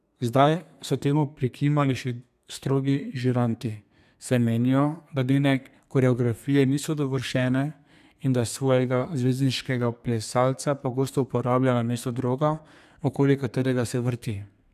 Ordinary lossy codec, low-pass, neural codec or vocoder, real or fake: none; 14.4 kHz; codec, 32 kHz, 1.9 kbps, SNAC; fake